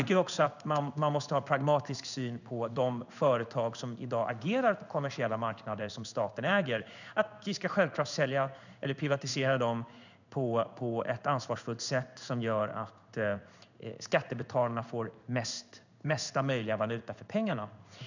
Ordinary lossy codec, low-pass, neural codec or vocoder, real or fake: none; 7.2 kHz; codec, 16 kHz in and 24 kHz out, 1 kbps, XY-Tokenizer; fake